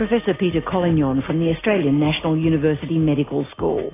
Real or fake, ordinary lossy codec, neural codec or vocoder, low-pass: real; AAC, 16 kbps; none; 3.6 kHz